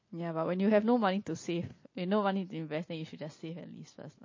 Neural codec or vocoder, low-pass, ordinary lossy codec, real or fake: none; 7.2 kHz; MP3, 32 kbps; real